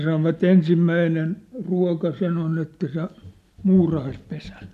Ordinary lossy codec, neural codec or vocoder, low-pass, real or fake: none; none; 14.4 kHz; real